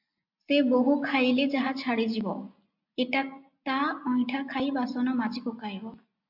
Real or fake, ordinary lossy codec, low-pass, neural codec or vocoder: real; MP3, 48 kbps; 5.4 kHz; none